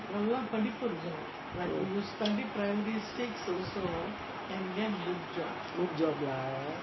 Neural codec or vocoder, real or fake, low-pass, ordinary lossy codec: none; real; 7.2 kHz; MP3, 24 kbps